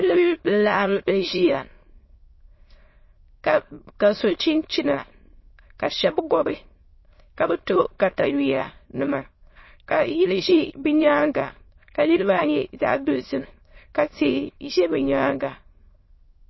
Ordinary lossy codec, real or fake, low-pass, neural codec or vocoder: MP3, 24 kbps; fake; 7.2 kHz; autoencoder, 22.05 kHz, a latent of 192 numbers a frame, VITS, trained on many speakers